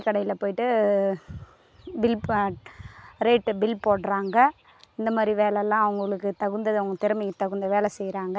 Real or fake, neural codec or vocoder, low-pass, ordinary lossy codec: real; none; none; none